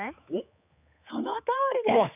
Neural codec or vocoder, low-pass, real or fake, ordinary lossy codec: codec, 16 kHz, 4 kbps, X-Codec, HuBERT features, trained on general audio; 3.6 kHz; fake; none